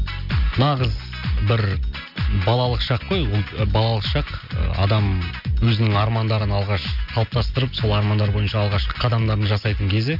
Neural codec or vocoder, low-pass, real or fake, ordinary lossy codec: none; 5.4 kHz; real; none